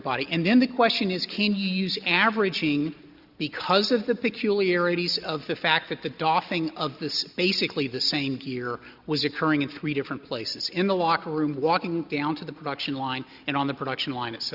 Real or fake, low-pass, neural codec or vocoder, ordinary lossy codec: real; 5.4 kHz; none; Opus, 64 kbps